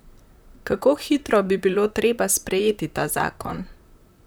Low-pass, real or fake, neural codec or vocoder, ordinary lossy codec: none; fake; vocoder, 44.1 kHz, 128 mel bands, Pupu-Vocoder; none